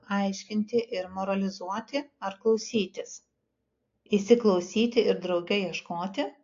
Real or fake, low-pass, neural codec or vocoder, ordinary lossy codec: real; 7.2 kHz; none; AAC, 48 kbps